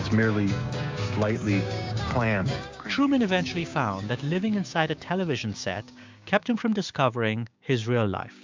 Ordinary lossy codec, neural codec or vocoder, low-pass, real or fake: MP3, 64 kbps; codec, 16 kHz, 6 kbps, DAC; 7.2 kHz; fake